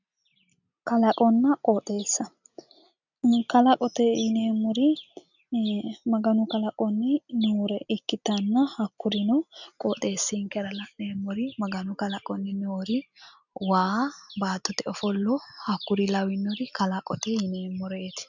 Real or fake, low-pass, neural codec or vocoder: real; 7.2 kHz; none